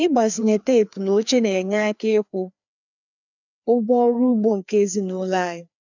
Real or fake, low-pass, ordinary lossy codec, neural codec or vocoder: fake; 7.2 kHz; none; codec, 16 kHz, 2 kbps, FreqCodec, larger model